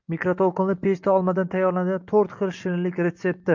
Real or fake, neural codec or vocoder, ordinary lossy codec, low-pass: real; none; MP3, 64 kbps; 7.2 kHz